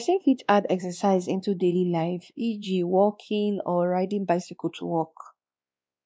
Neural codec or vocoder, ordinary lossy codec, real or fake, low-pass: codec, 16 kHz, 2 kbps, X-Codec, WavLM features, trained on Multilingual LibriSpeech; none; fake; none